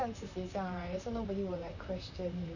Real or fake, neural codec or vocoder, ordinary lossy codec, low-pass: fake; vocoder, 44.1 kHz, 128 mel bands, Pupu-Vocoder; none; 7.2 kHz